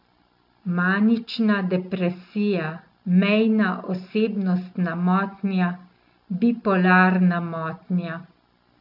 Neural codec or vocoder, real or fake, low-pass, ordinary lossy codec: none; real; 5.4 kHz; none